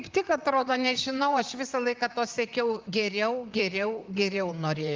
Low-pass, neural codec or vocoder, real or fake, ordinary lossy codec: 7.2 kHz; vocoder, 44.1 kHz, 128 mel bands, Pupu-Vocoder; fake; Opus, 24 kbps